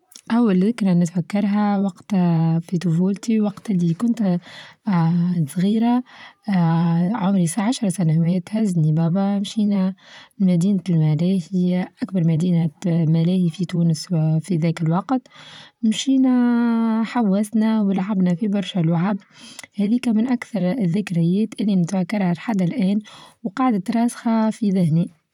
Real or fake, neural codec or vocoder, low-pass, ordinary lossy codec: fake; vocoder, 44.1 kHz, 128 mel bands, Pupu-Vocoder; 19.8 kHz; none